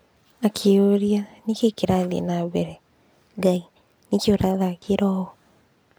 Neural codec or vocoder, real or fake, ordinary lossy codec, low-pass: none; real; none; none